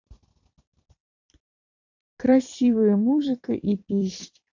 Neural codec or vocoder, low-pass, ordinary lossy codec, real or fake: vocoder, 44.1 kHz, 128 mel bands every 256 samples, BigVGAN v2; 7.2 kHz; AAC, 48 kbps; fake